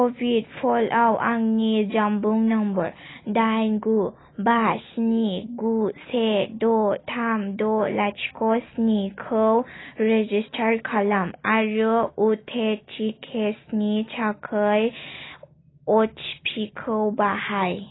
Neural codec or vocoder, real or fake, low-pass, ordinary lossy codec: none; real; 7.2 kHz; AAC, 16 kbps